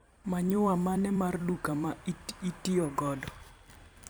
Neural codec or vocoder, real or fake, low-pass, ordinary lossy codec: vocoder, 44.1 kHz, 128 mel bands every 256 samples, BigVGAN v2; fake; none; none